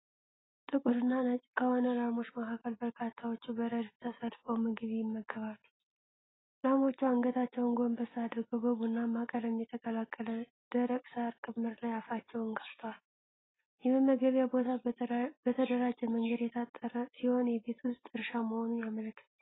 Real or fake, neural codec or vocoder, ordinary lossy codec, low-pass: real; none; AAC, 16 kbps; 7.2 kHz